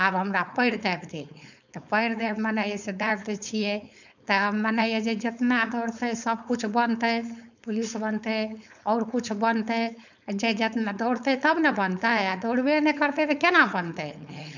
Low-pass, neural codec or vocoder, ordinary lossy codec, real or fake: 7.2 kHz; codec, 16 kHz, 4.8 kbps, FACodec; none; fake